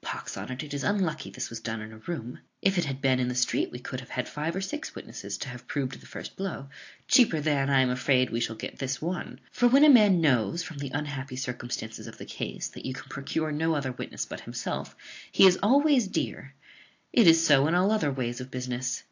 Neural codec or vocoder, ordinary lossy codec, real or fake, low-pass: none; AAC, 48 kbps; real; 7.2 kHz